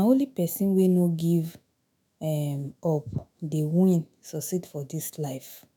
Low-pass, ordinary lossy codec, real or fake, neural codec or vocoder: none; none; fake; autoencoder, 48 kHz, 128 numbers a frame, DAC-VAE, trained on Japanese speech